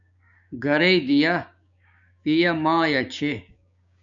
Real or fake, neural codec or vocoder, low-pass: fake; codec, 16 kHz, 6 kbps, DAC; 7.2 kHz